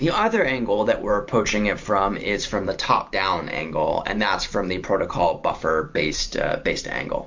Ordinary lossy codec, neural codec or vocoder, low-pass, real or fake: MP3, 48 kbps; vocoder, 44.1 kHz, 128 mel bands every 512 samples, BigVGAN v2; 7.2 kHz; fake